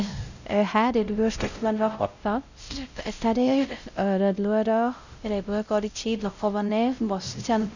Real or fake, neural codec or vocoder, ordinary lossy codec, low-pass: fake; codec, 16 kHz, 0.5 kbps, X-Codec, WavLM features, trained on Multilingual LibriSpeech; none; 7.2 kHz